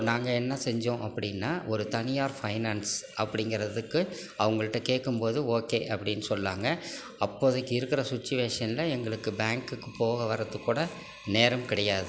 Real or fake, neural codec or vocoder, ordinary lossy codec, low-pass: real; none; none; none